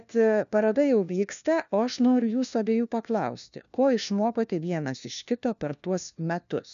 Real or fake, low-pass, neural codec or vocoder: fake; 7.2 kHz; codec, 16 kHz, 1 kbps, FunCodec, trained on LibriTTS, 50 frames a second